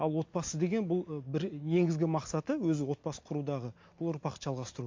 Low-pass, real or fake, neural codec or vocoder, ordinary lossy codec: 7.2 kHz; real; none; MP3, 48 kbps